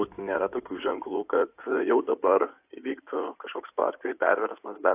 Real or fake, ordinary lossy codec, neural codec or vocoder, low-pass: fake; AAC, 32 kbps; codec, 16 kHz in and 24 kHz out, 2.2 kbps, FireRedTTS-2 codec; 3.6 kHz